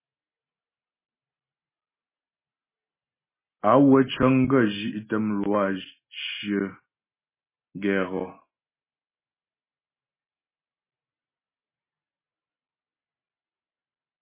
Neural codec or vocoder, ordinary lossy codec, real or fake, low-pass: none; MP3, 16 kbps; real; 3.6 kHz